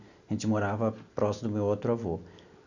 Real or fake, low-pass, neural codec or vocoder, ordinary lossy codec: real; 7.2 kHz; none; none